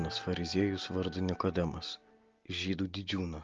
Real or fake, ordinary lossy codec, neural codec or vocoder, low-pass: real; Opus, 32 kbps; none; 7.2 kHz